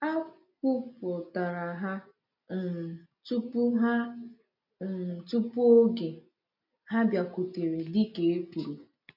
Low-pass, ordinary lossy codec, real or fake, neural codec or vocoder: 5.4 kHz; none; real; none